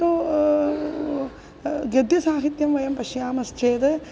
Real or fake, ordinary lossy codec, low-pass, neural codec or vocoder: real; none; none; none